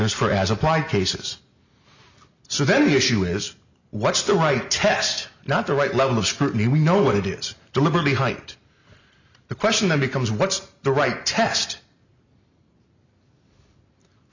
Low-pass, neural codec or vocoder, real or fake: 7.2 kHz; none; real